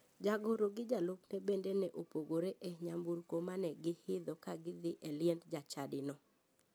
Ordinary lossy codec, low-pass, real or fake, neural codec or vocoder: none; none; real; none